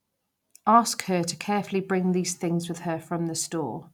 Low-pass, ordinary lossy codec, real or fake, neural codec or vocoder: 19.8 kHz; none; real; none